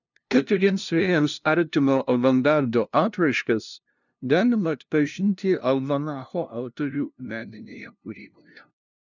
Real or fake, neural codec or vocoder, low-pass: fake; codec, 16 kHz, 0.5 kbps, FunCodec, trained on LibriTTS, 25 frames a second; 7.2 kHz